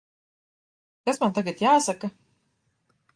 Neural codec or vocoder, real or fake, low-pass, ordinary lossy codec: none; real; 9.9 kHz; Opus, 32 kbps